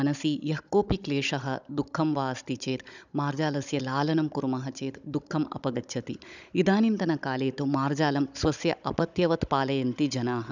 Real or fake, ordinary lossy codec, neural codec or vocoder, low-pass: fake; none; codec, 16 kHz, 16 kbps, FunCodec, trained on Chinese and English, 50 frames a second; 7.2 kHz